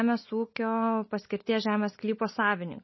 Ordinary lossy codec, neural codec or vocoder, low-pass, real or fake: MP3, 24 kbps; none; 7.2 kHz; real